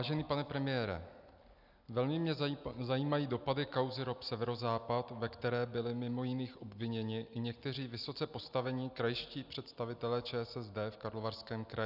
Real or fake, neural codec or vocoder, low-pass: real; none; 5.4 kHz